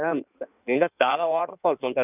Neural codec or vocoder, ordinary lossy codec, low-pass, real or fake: codec, 16 kHz in and 24 kHz out, 1.1 kbps, FireRedTTS-2 codec; none; 3.6 kHz; fake